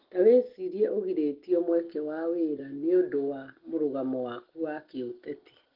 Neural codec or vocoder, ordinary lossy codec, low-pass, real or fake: none; Opus, 16 kbps; 5.4 kHz; real